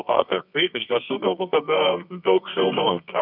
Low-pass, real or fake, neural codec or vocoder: 5.4 kHz; fake; codec, 24 kHz, 0.9 kbps, WavTokenizer, medium music audio release